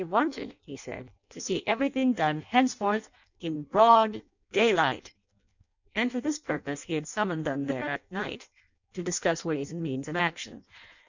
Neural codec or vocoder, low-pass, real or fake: codec, 16 kHz in and 24 kHz out, 0.6 kbps, FireRedTTS-2 codec; 7.2 kHz; fake